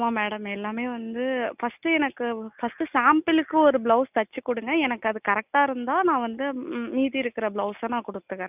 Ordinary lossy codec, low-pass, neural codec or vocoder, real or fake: none; 3.6 kHz; none; real